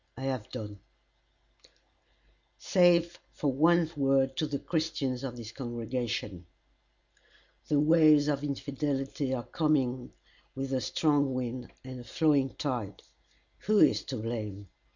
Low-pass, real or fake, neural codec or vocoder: 7.2 kHz; real; none